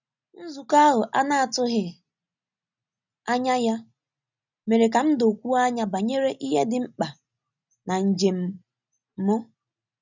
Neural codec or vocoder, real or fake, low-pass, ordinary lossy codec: none; real; 7.2 kHz; none